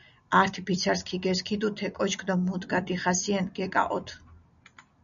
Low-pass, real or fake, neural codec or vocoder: 7.2 kHz; real; none